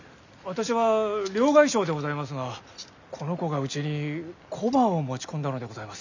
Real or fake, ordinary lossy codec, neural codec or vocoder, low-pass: real; none; none; 7.2 kHz